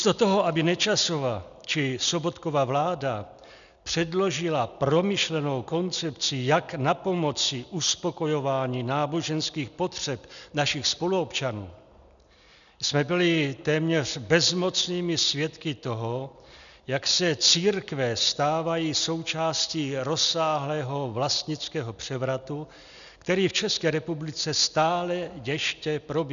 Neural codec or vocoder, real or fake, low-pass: none; real; 7.2 kHz